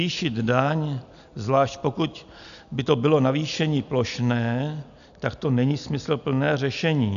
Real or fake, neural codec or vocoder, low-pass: real; none; 7.2 kHz